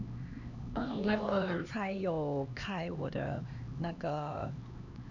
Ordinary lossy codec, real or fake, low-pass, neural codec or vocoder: none; fake; 7.2 kHz; codec, 16 kHz, 2 kbps, X-Codec, HuBERT features, trained on LibriSpeech